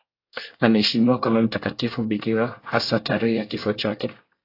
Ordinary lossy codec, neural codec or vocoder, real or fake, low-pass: AAC, 32 kbps; codec, 24 kHz, 1 kbps, SNAC; fake; 5.4 kHz